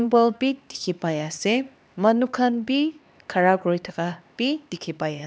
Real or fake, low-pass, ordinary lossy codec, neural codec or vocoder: fake; none; none; codec, 16 kHz, 2 kbps, X-Codec, HuBERT features, trained on LibriSpeech